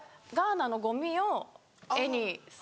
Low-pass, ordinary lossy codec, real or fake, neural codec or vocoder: none; none; real; none